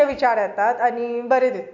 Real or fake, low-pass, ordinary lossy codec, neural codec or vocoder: real; 7.2 kHz; MP3, 64 kbps; none